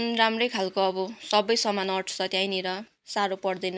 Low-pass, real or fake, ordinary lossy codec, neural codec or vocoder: none; real; none; none